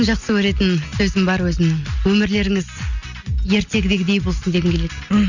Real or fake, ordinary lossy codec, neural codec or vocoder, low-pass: real; none; none; 7.2 kHz